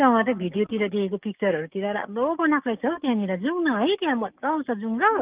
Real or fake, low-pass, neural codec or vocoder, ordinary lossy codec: fake; 3.6 kHz; codec, 44.1 kHz, 7.8 kbps, DAC; Opus, 32 kbps